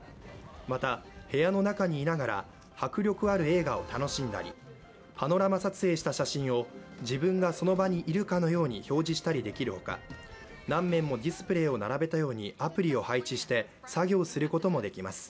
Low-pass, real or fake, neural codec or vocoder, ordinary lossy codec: none; real; none; none